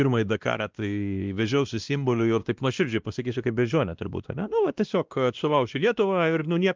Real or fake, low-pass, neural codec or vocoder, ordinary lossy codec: fake; 7.2 kHz; codec, 16 kHz, 1 kbps, X-Codec, WavLM features, trained on Multilingual LibriSpeech; Opus, 32 kbps